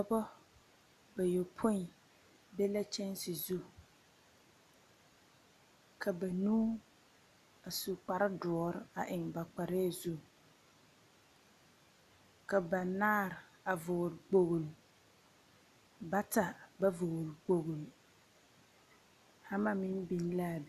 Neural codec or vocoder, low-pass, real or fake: none; 14.4 kHz; real